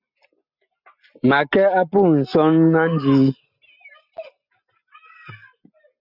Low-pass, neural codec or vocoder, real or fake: 5.4 kHz; none; real